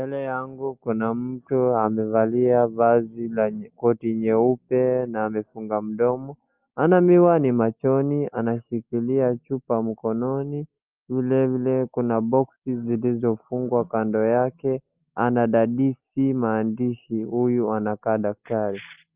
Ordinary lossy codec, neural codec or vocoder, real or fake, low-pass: Opus, 24 kbps; none; real; 3.6 kHz